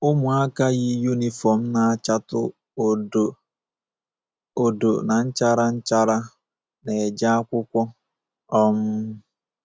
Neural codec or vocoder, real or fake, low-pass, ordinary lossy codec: none; real; none; none